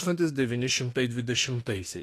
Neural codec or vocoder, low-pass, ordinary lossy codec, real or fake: autoencoder, 48 kHz, 32 numbers a frame, DAC-VAE, trained on Japanese speech; 14.4 kHz; AAC, 48 kbps; fake